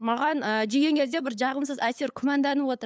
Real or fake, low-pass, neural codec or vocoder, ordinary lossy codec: fake; none; codec, 16 kHz, 8 kbps, FunCodec, trained on LibriTTS, 25 frames a second; none